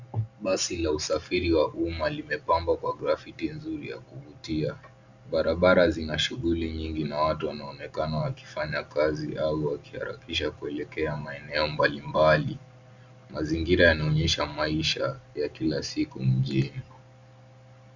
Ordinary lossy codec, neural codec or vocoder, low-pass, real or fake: Opus, 64 kbps; none; 7.2 kHz; real